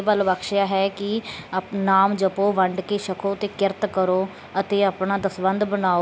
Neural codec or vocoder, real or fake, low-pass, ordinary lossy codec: none; real; none; none